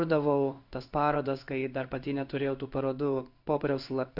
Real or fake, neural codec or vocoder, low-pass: fake; codec, 16 kHz in and 24 kHz out, 1 kbps, XY-Tokenizer; 5.4 kHz